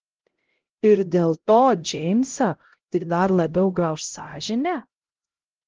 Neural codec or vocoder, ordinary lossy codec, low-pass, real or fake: codec, 16 kHz, 0.5 kbps, X-Codec, HuBERT features, trained on LibriSpeech; Opus, 16 kbps; 7.2 kHz; fake